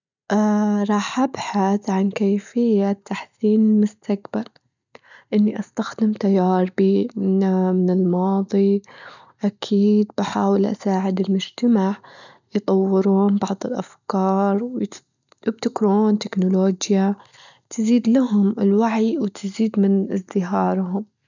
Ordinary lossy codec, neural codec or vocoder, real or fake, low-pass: none; none; real; 7.2 kHz